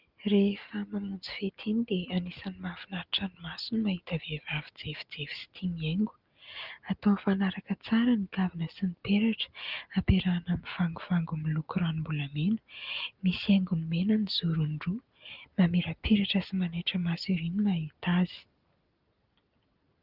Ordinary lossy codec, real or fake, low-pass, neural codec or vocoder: Opus, 32 kbps; real; 5.4 kHz; none